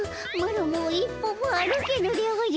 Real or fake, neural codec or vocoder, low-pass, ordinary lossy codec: real; none; none; none